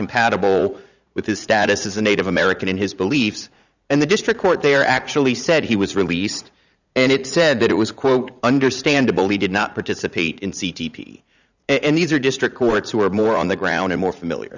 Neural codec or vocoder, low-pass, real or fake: vocoder, 44.1 kHz, 80 mel bands, Vocos; 7.2 kHz; fake